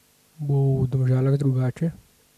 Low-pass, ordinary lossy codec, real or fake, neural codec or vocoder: 14.4 kHz; none; real; none